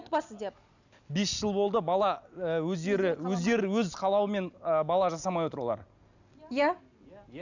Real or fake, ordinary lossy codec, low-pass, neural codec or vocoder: real; none; 7.2 kHz; none